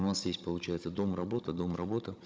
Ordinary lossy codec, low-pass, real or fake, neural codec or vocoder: none; none; fake; codec, 16 kHz, 16 kbps, FunCodec, trained on Chinese and English, 50 frames a second